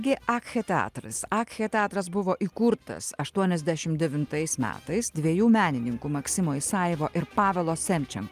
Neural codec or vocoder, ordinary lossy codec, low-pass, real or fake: none; Opus, 32 kbps; 14.4 kHz; real